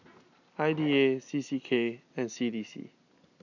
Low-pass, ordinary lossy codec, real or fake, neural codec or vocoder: 7.2 kHz; none; real; none